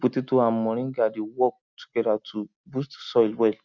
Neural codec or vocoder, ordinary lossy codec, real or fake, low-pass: none; none; real; 7.2 kHz